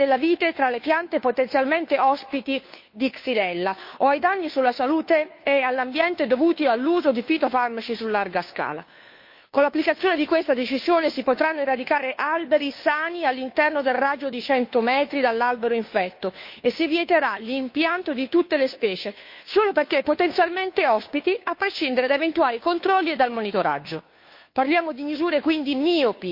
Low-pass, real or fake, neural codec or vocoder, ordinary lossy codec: 5.4 kHz; fake; codec, 16 kHz, 2 kbps, FunCodec, trained on Chinese and English, 25 frames a second; MP3, 32 kbps